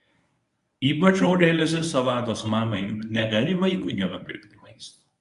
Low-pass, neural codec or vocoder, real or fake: 10.8 kHz; codec, 24 kHz, 0.9 kbps, WavTokenizer, medium speech release version 1; fake